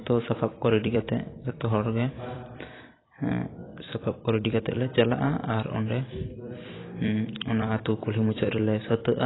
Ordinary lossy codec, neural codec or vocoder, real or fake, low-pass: AAC, 16 kbps; vocoder, 44.1 kHz, 128 mel bands every 256 samples, BigVGAN v2; fake; 7.2 kHz